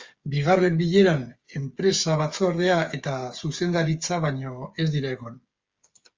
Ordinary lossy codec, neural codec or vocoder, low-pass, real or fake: Opus, 32 kbps; codec, 16 kHz, 8 kbps, FreqCodec, smaller model; 7.2 kHz; fake